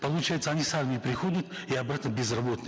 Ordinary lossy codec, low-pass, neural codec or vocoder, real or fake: none; none; none; real